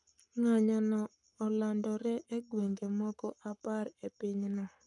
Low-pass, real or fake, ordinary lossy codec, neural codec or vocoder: 10.8 kHz; fake; none; codec, 44.1 kHz, 7.8 kbps, Pupu-Codec